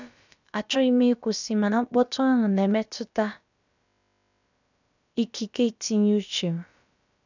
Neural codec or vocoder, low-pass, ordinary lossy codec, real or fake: codec, 16 kHz, about 1 kbps, DyCAST, with the encoder's durations; 7.2 kHz; none; fake